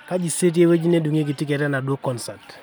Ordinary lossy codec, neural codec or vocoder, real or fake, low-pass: none; none; real; none